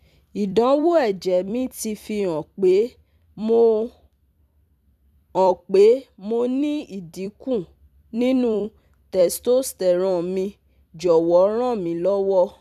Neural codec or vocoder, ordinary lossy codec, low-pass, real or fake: vocoder, 44.1 kHz, 128 mel bands every 256 samples, BigVGAN v2; none; 14.4 kHz; fake